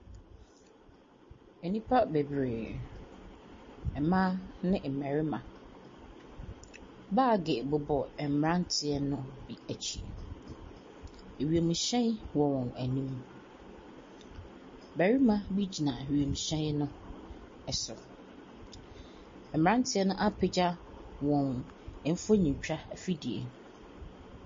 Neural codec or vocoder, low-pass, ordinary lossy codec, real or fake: none; 7.2 kHz; MP3, 32 kbps; real